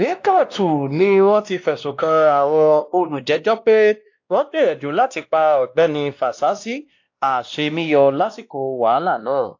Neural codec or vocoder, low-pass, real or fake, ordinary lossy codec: codec, 16 kHz, 1 kbps, X-Codec, WavLM features, trained on Multilingual LibriSpeech; 7.2 kHz; fake; AAC, 48 kbps